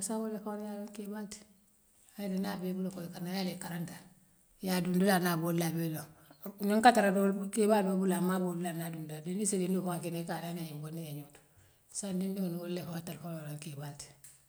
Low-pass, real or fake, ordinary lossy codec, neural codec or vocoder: none; real; none; none